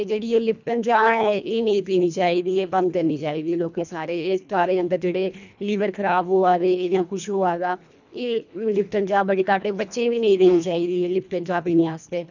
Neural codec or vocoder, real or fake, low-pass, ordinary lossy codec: codec, 24 kHz, 1.5 kbps, HILCodec; fake; 7.2 kHz; none